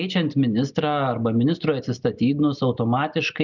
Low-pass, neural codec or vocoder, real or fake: 7.2 kHz; none; real